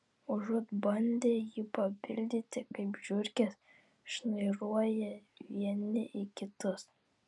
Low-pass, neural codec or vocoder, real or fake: 10.8 kHz; none; real